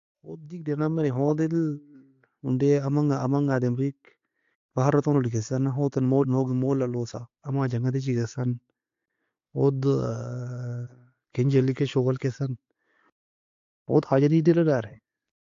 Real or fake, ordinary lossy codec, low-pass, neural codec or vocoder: real; AAC, 48 kbps; 7.2 kHz; none